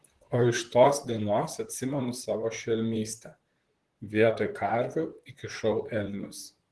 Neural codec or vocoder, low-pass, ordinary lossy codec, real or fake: vocoder, 44.1 kHz, 128 mel bands, Pupu-Vocoder; 10.8 kHz; Opus, 16 kbps; fake